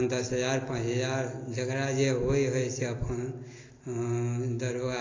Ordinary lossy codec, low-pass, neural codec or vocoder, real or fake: AAC, 32 kbps; 7.2 kHz; none; real